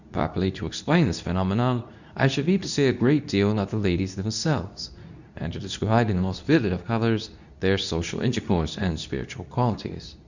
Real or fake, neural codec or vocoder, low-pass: fake; codec, 24 kHz, 0.9 kbps, WavTokenizer, medium speech release version 2; 7.2 kHz